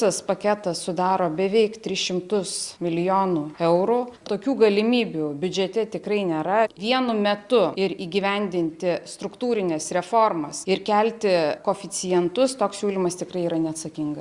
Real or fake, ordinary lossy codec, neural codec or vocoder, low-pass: real; Opus, 64 kbps; none; 10.8 kHz